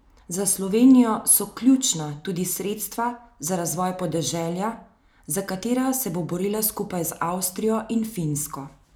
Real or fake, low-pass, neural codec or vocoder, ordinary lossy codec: real; none; none; none